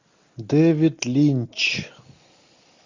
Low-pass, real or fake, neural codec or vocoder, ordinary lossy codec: 7.2 kHz; real; none; AAC, 48 kbps